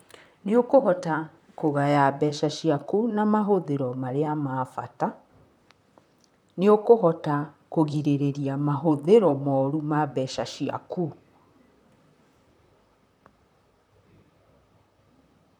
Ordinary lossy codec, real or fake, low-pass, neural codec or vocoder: none; fake; 19.8 kHz; vocoder, 44.1 kHz, 128 mel bands, Pupu-Vocoder